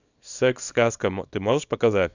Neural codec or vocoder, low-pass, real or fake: codec, 24 kHz, 0.9 kbps, WavTokenizer, small release; 7.2 kHz; fake